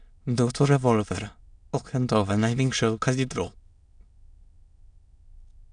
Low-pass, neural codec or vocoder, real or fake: 9.9 kHz; autoencoder, 22.05 kHz, a latent of 192 numbers a frame, VITS, trained on many speakers; fake